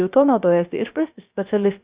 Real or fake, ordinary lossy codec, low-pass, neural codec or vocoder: fake; Opus, 64 kbps; 3.6 kHz; codec, 16 kHz, 0.3 kbps, FocalCodec